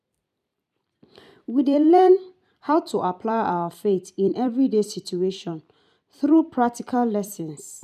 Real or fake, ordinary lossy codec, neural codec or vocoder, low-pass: fake; none; vocoder, 44.1 kHz, 128 mel bands every 256 samples, BigVGAN v2; 14.4 kHz